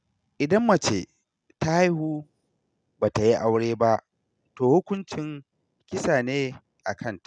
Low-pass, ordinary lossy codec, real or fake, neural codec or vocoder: 9.9 kHz; none; real; none